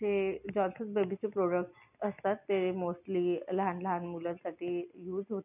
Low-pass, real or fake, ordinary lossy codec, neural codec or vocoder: 3.6 kHz; real; none; none